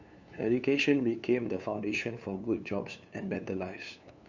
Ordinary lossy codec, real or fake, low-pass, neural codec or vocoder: none; fake; 7.2 kHz; codec, 16 kHz, 2 kbps, FunCodec, trained on LibriTTS, 25 frames a second